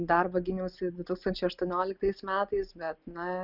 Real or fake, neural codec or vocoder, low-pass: real; none; 5.4 kHz